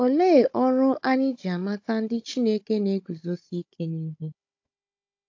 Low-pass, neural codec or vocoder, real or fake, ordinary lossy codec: 7.2 kHz; codec, 16 kHz, 16 kbps, FunCodec, trained on Chinese and English, 50 frames a second; fake; AAC, 48 kbps